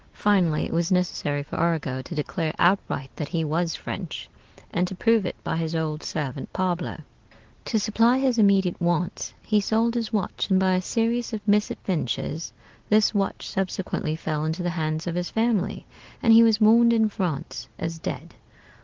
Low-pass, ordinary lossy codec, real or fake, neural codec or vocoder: 7.2 kHz; Opus, 16 kbps; real; none